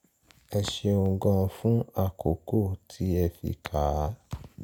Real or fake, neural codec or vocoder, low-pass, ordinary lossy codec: real; none; 19.8 kHz; none